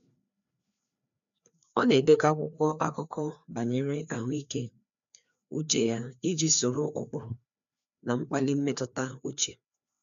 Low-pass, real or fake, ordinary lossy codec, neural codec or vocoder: 7.2 kHz; fake; none; codec, 16 kHz, 2 kbps, FreqCodec, larger model